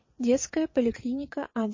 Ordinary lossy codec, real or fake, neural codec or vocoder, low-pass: MP3, 32 kbps; fake; vocoder, 22.05 kHz, 80 mel bands, WaveNeXt; 7.2 kHz